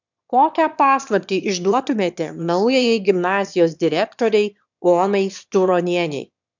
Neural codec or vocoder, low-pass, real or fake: autoencoder, 22.05 kHz, a latent of 192 numbers a frame, VITS, trained on one speaker; 7.2 kHz; fake